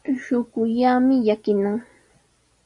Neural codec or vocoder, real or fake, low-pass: none; real; 10.8 kHz